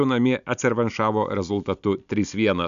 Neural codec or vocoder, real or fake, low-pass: none; real; 7.2 kHz